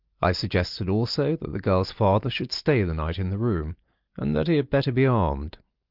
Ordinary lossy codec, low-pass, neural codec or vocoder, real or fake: Opus, 32 kbps; 5.4 kHz; none; real